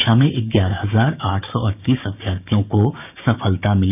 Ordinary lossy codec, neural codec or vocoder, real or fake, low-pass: none; codec, 16 kHz, 6 kbps, DAC; fake; 3.6 kHz